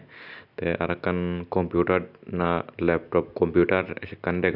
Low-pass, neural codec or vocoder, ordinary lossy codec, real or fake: 5.4 kHz; none; none; real